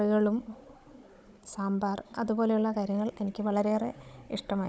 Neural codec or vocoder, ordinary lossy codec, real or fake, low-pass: codec, 16 kHz, 16 kbps, FunCodec, trained on Chinese and English, 50 frames a second; none; fake; none